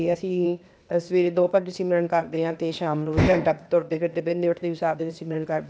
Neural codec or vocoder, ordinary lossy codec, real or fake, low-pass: codec, 16 kHz, 0.8 kbps, ZipCodec; none; fake; none